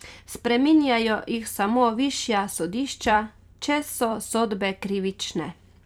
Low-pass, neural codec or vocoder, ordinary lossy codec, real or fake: 19.8 kHz; vocoder, 44.1 kHz, 128 mel bands every 256 samples, BigVGAN v2; none; fake